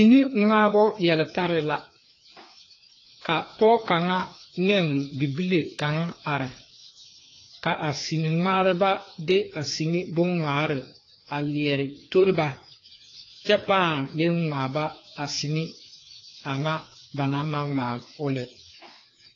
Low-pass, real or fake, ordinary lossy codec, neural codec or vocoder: 7.2 kHz; fake; AAC, 32 kbps; codec, 16 kHz, 2 kbps, FreqCodec, larger model